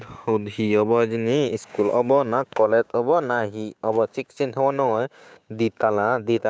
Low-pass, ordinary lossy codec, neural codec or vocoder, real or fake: none; none; codec, 16 kHz, 6 kbps, DAC; fake